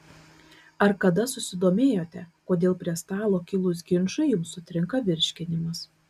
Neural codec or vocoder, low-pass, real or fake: none; 14.4 kHz; real